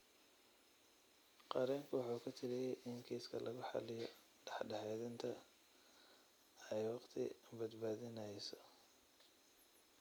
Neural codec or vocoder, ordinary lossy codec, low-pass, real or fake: none; none; none; real